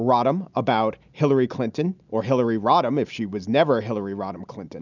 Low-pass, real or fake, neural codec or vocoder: 7.2 kHz; real; none